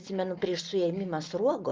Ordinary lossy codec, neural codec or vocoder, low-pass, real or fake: Opus, 24 kbps; none; 7.2 kHz; real